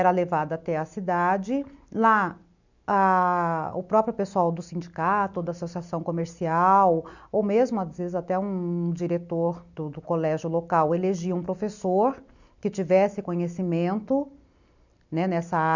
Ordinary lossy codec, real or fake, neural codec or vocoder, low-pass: none; real; none; 7.2 kHz